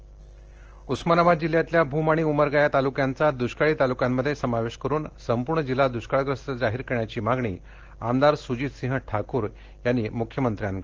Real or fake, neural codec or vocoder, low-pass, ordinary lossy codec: real; none; 7.2 kHz; Opus, 16 kbps